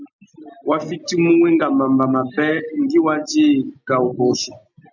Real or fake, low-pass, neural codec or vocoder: real; 7.2 kHz; none